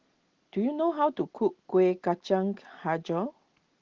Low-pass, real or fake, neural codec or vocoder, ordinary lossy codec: 7.2 kHz; real; none; Opus, 16 kbps